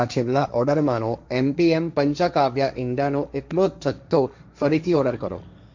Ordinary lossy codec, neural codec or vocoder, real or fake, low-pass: MP3, 64 kbps; codec, 16 kHz, 1.1 kbps, Voila-Tokenizer; fake; 7.2 kHz